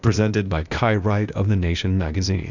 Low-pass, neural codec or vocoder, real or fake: 7.2 kHz; codec, 16 kHz, 0.8 kbps, ZipCodec; fake